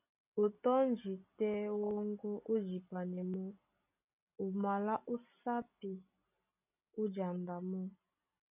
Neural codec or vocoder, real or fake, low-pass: none; real; 3.6 kHz